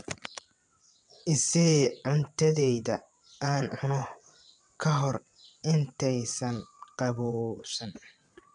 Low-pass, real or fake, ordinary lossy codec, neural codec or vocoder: 9.9 kHz; fake; none; vocoder, 22.05 kHz, 80 mel bands, WaveNeXt